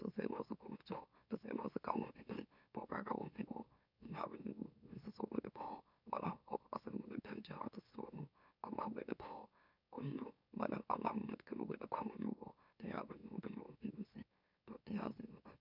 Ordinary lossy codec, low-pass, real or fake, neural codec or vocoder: none; 5.4 kHz; fake; autoencoder, 44.1 kHz, a latent of 192 numbers a frame, MeloTTS